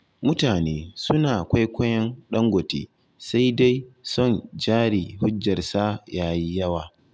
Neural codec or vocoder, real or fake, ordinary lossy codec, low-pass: none; real; none; none